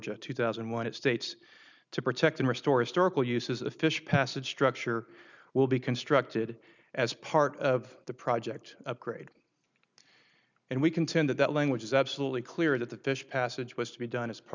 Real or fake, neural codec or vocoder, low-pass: real; none; 7.2 kHz